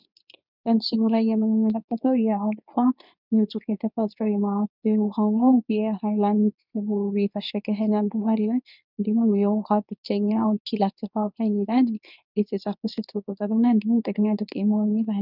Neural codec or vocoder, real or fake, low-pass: codec, 24 kHz, 0.9 kbps, WavTokenizer, medium speech release version 2; fake; 5.4 kHz